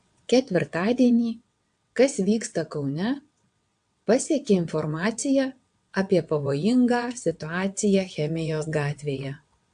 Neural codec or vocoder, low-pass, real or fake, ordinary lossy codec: vocoder, 22.05 kHz, 80 mel bands, WaveNeXt; 9.9 kHz; fake; AAC, 64 kbps